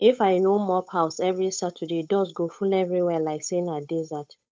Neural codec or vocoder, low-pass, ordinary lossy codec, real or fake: codec, 16 kHz, 8 kbps, FunCodec, trained on Chinese and English, 25 frames a second; none; none; fake